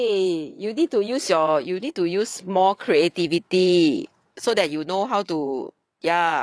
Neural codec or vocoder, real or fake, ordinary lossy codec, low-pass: vocoder, 22.05 kHz, 80 mel bands, WaveNeXt; fake; none; none